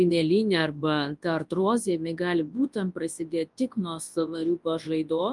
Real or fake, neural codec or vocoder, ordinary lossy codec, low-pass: fake; codec, 24 kHz, 0.9 kbps, WavTokenizer, large speech release; Opus, 32 kbps; 10.8 kHz